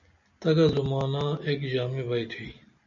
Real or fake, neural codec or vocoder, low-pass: real; none; 7.2 kHz